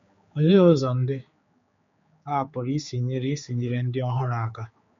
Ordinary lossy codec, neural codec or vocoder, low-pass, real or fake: MP3, 48 kbps; codec, 16 kHz, 4 kbps, X-Codec, HuBERT features, trained on general audio; 7.2 kHz; fake